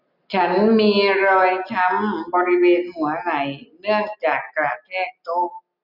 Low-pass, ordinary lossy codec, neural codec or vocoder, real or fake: 5.4 kHz; none; none; real